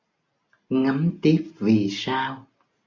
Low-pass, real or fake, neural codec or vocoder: 7.2 kHz; real; none